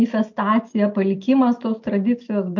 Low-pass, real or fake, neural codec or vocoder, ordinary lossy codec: 7.2 kHz; real; none; MP3, 48 kbps